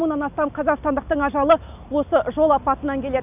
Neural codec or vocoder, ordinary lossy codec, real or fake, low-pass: none; none; real; 3.6 kHz